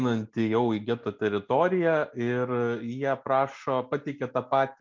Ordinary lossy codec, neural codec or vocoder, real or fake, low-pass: MP3, 64 kbps; none; real; 7.2 kHz